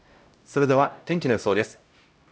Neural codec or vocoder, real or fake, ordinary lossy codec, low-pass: codec, 16 kHz, 0.5 kbps, X-Codec, HuBERT features, trained on LibriSpeech; fake; none; none